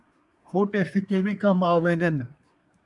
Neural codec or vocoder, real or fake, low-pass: codec, 24 kHz, 1 kbps, SNAC; fake; 10.8 kHz